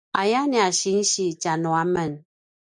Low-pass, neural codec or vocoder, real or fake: 10.8 kHz; none; real